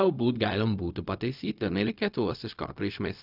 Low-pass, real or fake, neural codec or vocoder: 5.4 kHz; fake; codec, 16 kHz, 0.4 kbps, LongCat-Audio-Codec